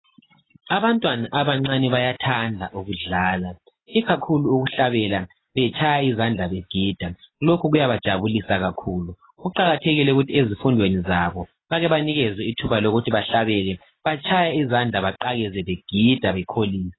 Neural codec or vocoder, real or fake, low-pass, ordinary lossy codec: none; real; 7.2 kHz; AAC, 16 kbps